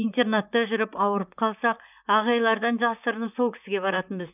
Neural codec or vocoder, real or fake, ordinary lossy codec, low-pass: vocoder, 44.1 kHz, 128 mel bands, Pupu-Vocoder; fake; none; 3.6 kHz